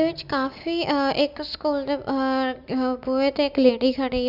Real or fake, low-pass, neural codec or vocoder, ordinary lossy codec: real; 5.4 kHz; none; Opus, 64 kbps